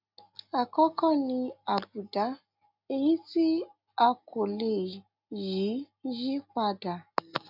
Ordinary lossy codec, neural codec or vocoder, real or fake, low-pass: none; none; real; 5.4 kHz